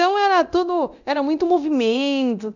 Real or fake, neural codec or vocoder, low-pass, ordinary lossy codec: fake; codec, 24 kHz, 0.9 kbps, DualCodec; 7.2 kHz; none